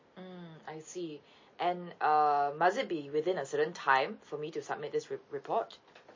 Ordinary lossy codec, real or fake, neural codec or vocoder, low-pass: MP3, 32 kbps; real; none; 7.2 kHz